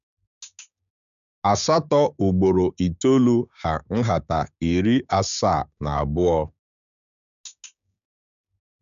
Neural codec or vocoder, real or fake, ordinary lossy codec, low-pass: codec, 16 kHz, 6 kbps, DAC; fake; none; 7.2 kHz